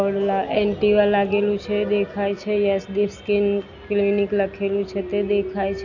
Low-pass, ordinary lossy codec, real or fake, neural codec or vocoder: 7.2 kHz; none; real; none